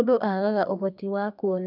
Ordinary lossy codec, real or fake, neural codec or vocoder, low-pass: none; fake; codec, 32 kHz, 1.9 kbps, SNAC; 5.4 kHz